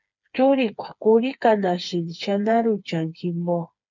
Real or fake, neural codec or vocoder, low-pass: fake; codec, 16 kHz, 4 kbps, FreqCodec, smaller model; 7.2 kHz